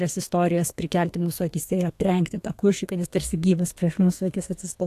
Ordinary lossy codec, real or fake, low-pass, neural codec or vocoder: AAC, 64 kbps; fake; 14.4 kHz; codec, 32 kHz, 1.9 kbps, SNAC